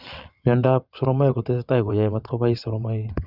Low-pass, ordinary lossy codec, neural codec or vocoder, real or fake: 5.4 kHz; Opus, 64 kbps; vocoder, 22.05 kHz, 80 mel bands, WaveNeXt; fake